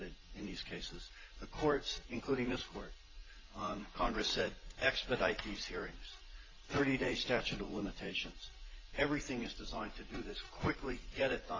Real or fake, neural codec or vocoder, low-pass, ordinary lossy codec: fake; vocoder, 44.1 kHz, 80 mel bands, Vocos; 7.2 kHz; AAC, 32 kbps